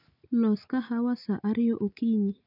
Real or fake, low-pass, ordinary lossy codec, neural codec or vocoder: real; 5.4 kHz; none; none